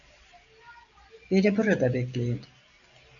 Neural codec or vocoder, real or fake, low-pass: none; real; 7.2 kHz